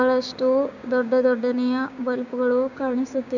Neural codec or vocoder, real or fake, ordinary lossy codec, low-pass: codec, 16 kHz, 6 kbps, DAC; fake; none; 7.2 kHz